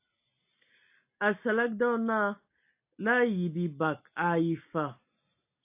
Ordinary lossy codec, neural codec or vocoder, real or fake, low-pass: AAC, 32 kbps; none; real; 3.6 kHz